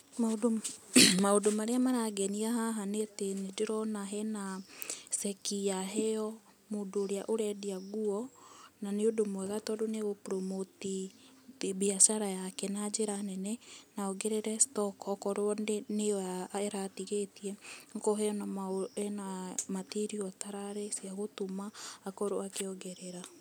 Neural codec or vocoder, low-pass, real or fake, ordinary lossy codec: none; none; real; none